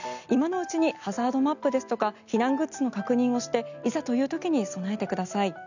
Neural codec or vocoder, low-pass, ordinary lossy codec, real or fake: none; 7.2 kHz; none; real